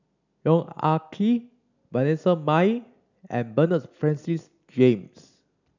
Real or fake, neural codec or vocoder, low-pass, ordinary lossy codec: real; none; 7.2 kHz; none